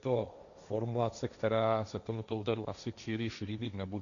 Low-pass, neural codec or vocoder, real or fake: 7.2 kHz; codec, 16 kHz, 1.1 kbps, Voila-Tokenizer; fake